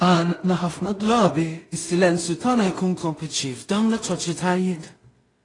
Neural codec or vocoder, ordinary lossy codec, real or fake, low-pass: codec, 16 kHz in and 24 kHz out, 0.4 kbps, LongCat-Audio-Codec, two codebook decoder; AAC, 32 kbps; fake; 10.8 kHz